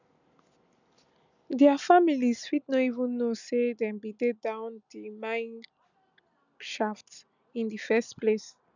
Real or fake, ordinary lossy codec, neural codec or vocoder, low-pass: real; none; none; 7.2 kHz